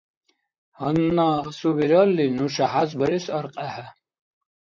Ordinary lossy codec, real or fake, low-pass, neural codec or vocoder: MP3, 48 kbps; fake; 7.2 kHz; vocoder, 44.1 kHz, 128 mel bands, Pupu-Vocoder